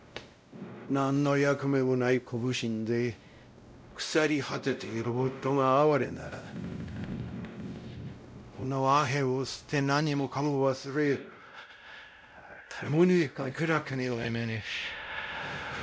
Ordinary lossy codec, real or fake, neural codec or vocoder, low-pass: none; fake; codec, 16 kHz, 0.5 kbps, X-Codec, WavLM features, trained on Multilingual LibriSpeech; none